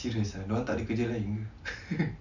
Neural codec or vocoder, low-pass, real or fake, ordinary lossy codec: none; 7.2 kHz; real; none